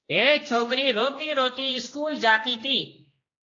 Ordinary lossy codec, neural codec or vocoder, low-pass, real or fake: AAC, 32 kbps; codec, 16 kHz, 1 kbps, X-Codec, HuBERT features, trained on general audio; 7.2 kHz; fake